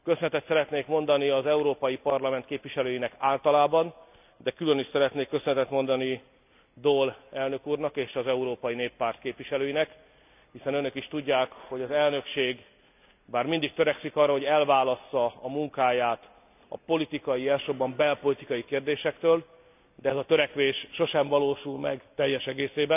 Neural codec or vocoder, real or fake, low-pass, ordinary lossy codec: none; real; 3.6 kHz; none